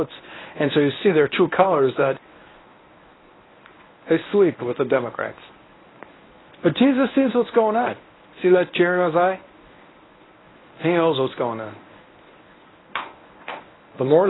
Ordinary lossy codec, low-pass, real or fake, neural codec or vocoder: AAC, 16 kbps; 7.2 kHz; fake; codec, 24 kHz, 0.9 kbps, WavTokenizer, medium speech release version 1